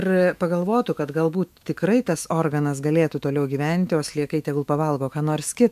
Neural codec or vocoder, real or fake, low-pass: autoencoder, 48 kHz, 128 numbers a frame, DAC-VAE, trained on Japanese speech; fake; 14.4 kHz